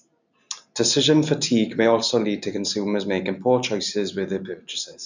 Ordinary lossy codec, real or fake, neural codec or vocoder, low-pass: none; real; none; 7.2 kHz